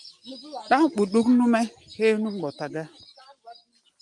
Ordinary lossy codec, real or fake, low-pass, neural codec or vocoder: Opus, 32 kbps; real; 10.8 kHz; none